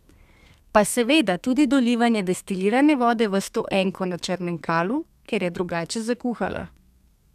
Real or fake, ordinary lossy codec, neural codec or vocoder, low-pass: fake; none; codec, 32 kHz, 1.9 kbps, SNAC; 14.4 kHz